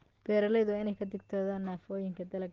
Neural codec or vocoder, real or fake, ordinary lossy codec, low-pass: none; real; Opus, 16 kbps; 7.2 kHz